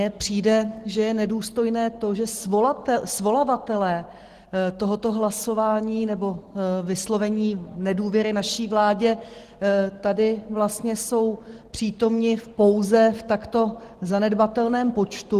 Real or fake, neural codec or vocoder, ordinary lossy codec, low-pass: real; none; Opus, 16 kbps; 14.4 kHz